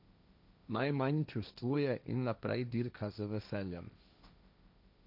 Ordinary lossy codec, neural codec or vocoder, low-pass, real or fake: none; codec, 16 kHz, 1.1 kbps, Voila-Tokenizer; 5.4 kHz; fake